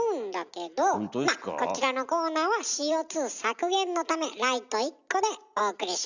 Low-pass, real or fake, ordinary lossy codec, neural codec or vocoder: 7.2 kHz; real; none; none